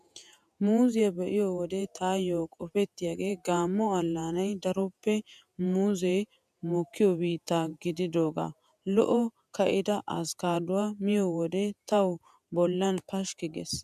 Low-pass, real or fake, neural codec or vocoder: 14.4 kHz; fake; vocoder, 48 kHz, 128 mel bands, Vocos